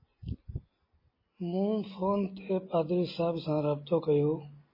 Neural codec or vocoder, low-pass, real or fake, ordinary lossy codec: none; 5.4 kHz; real; MP3, 24 kbps